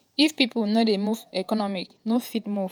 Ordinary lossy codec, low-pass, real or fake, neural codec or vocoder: none; none; fake; vocoder, 48 kHz, 128 mel bands, Vocos